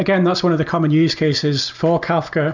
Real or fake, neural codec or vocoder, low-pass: real; none; 7.2 kHz